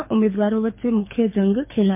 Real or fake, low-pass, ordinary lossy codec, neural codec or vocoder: fake; 3.6 kHz; MP3, 24 kbps; codec, 24 kHz, 6 kbps, HILCodec